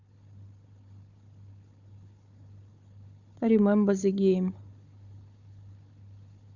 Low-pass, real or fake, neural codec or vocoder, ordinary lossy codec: 7.2 kHz; fake; codec, 16 kHz, 4 kbps, FunCodec, trained on Chinese and English, 50 frames a second; Opus, 64 kbps